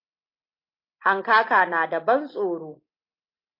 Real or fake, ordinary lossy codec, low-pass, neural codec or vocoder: real; MP3, 32 kbps; 5.4 kHz; none